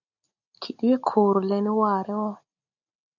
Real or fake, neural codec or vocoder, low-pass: real; none; 7.2 kHz